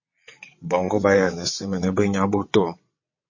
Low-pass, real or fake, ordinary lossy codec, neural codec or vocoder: 7.2 kHz; fake; MP3, 32 kbps; vocoder, 22.05 kHz, 80 mel bands, WaveNeXt